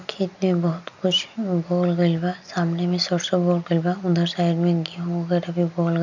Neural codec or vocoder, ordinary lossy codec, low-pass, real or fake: none; none; 7.2 kHz; real